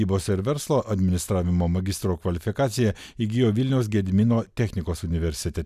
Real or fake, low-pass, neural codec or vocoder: real; 14.4 kHz; none